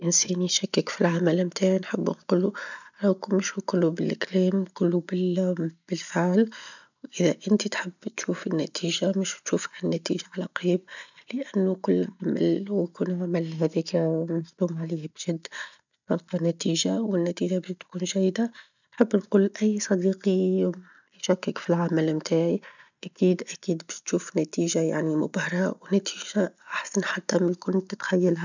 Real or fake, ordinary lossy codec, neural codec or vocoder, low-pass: real; none; none; 7.2 kHz